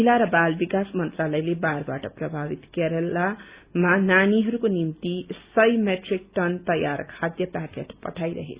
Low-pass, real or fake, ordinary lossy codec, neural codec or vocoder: 3.6 kHz; real; Opus, 64 kbps; none